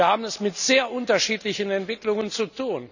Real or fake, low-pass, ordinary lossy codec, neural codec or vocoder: real; 7.2 kHz; none; none